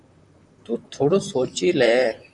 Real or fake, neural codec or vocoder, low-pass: fake; vocoder, 44.1 kHz, 128 mel bands, Pupu-Vocoder; 10.8 kHz